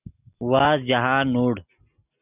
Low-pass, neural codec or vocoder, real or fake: 3.6 kHz; none; real